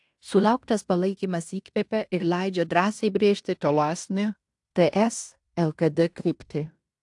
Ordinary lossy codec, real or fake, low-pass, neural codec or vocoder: AAC, 64 kbps; fake; 10.8 kHz; codec, 16 kHz in and 24 kHz out, 0.9 kbps, LongCat-Audio-Codec, fine tuned four codebook decoder